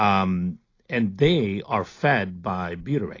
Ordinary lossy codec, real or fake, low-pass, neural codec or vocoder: AAC, 32 kbps; real; 7.2 kHz; none